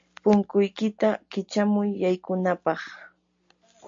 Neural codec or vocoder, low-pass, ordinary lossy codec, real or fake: none; 7.2 kHz; MP3, 48 kbps; real